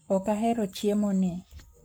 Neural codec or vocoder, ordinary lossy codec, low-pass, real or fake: codec, 44.1 kHz, 7.8 kbps, Pupu-Codec; none; none; fake